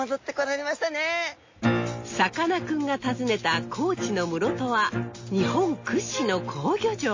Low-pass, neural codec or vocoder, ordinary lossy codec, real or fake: 7.2 kHz; none; MP3, 32 kbps; real